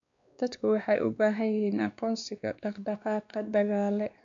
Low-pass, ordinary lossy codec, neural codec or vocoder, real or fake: 7.2 kHz; MP3, 64 kbps; codec, 16 kHz, 2 kbps, X-Codec, WavLM features, trained on Multilingual LibriSpeech; fake